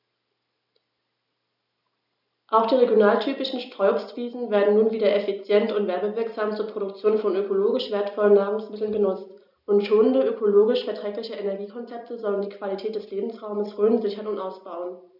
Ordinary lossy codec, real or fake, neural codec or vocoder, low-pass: none; real; none; 5.4 kHz